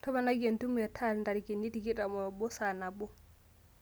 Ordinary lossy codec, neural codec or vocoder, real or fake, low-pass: none; none; real; none